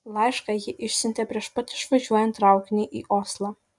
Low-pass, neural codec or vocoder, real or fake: 10.8 kHz; none; real